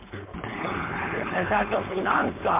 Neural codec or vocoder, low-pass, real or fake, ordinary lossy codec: codec, 16 kHz, 4.8 kbps, FACodec; 3.6 kHz; fake; MP3, 32 kbps